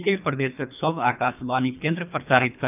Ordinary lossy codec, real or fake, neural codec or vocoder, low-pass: none; fake; codec, 24 kHz, 3 kbps, HILCodec; 3.6 kHz